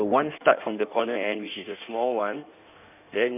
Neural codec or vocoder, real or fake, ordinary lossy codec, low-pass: codec, 16 kHz in and 24 kHz out, 1.1 kbps, FireRedTTS-2 codec; fake; none; 3.6 kHz